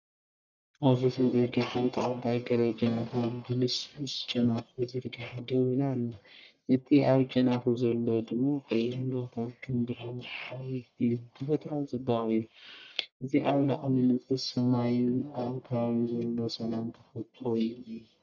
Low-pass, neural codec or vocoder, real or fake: 7.2 kHz; codec, 44.1 kHz, 1.7 kbps, Pupu-Codec; fake